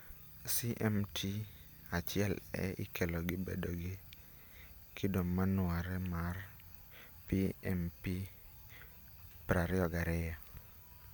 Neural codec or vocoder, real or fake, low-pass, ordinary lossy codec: none; real; none; none